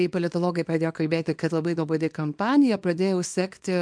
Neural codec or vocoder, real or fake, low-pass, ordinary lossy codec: codec, 24 kHz, 0.9 kbps, WavTokenizer, small release; fake; 9.9 kHz; MP3, 64 kbps